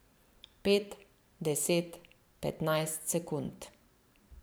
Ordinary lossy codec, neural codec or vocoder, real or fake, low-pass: none; vocoder, 44.1 kHz, 128 mel bands every 512 samples, BigVGAN v2; fake; none